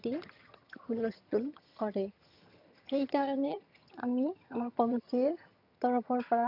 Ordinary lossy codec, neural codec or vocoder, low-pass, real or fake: none; vocoder, 22.05 kHz, 80 mel bands, HiFi-GAN; 5.4 kHz; fake